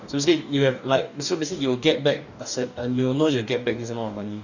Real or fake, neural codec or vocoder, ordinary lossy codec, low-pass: fake; codec, 44.1 kHz, 2.6 kbps, DAC; none; 7.2 kHz